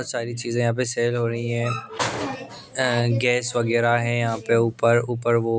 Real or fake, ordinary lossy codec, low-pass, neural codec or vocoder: real; none; none; none